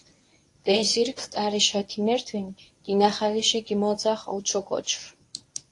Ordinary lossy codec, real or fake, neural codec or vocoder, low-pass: AAC, 48 kbps; fake; codec, 24 kHz, 0.9 kbps, WavTokenizer, medium speech release version 1; 10.8 kHz